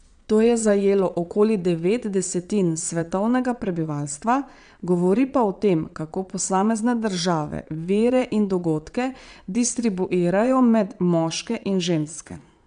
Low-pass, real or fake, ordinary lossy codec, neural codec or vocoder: 9.9 kHz; fake; none; vocoder, 22.05 kHz, 80 mel bands, Vocos